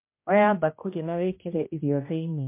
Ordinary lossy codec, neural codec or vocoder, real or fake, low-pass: MP3, 24 kbps; codec, 16 kHz, 1 kbps, X-Codec, HuBERT features, trained on general audio; fake; 3.6 kHz